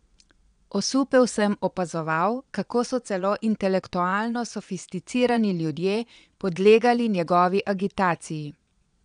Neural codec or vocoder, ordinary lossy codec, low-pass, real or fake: vocoder, 22.05 kHz, 80 mel bands, Vocos; none; 9.9 kHz; fake